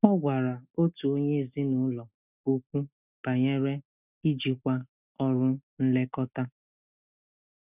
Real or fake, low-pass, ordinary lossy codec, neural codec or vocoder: real; 3.6 kHz; none; none